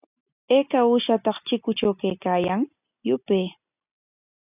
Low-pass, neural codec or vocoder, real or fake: 3.6 kHz; none; real